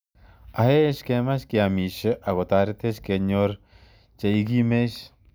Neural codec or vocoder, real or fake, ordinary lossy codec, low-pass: none; real; none; none